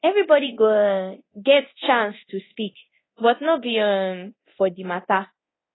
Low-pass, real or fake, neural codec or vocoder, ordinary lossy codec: 7.2 kHz; fake; codec, 24 kHz, 0.9 kbps, DualCodec; AAC, 16 kbps